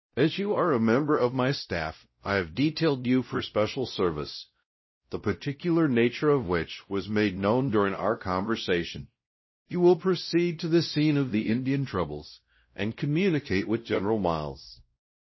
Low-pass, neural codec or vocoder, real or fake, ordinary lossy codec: 7.2 kHz; codec, 16 kHz, 0.5 kbps, X-Codec, WavLM features, trained on Multilingual LibriSpeech; fake; MP3, 24 kbps